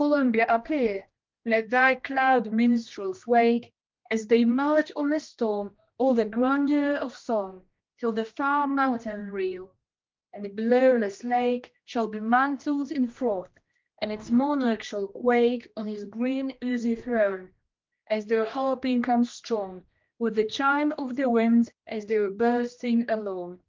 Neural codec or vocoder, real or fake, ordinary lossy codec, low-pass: codec, 16 kHz, 1 kbps, X-Codec, HuBERT features, trained on general audio; fake; Opus, 24 kbps; 7.2 kHz